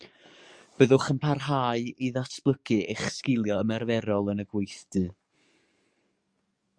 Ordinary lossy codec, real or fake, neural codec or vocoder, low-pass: Opus, 64 kbps; fake; codec, 44.1 kHz, 7.8 kbps, DAC; 9.9 kHz